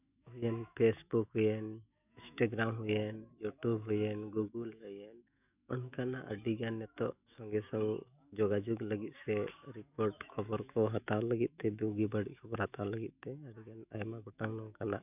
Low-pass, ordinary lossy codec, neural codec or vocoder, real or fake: 3.6 kHz; none; autoencoder, 48 kHz, 128 numbers a frame, DAC-VAE, trained on Japanese speech; fake